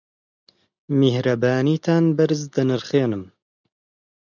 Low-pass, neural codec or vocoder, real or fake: 7.2 kHz; none; real